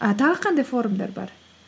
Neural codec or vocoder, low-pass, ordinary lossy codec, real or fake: none; none; none; real